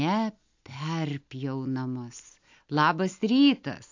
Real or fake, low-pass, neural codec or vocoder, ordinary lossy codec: real; 7.2 kHz; none; AAC, 48 kbps